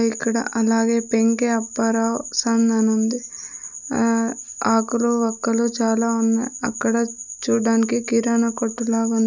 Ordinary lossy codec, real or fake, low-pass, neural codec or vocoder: none; real; 7.2 kHz; none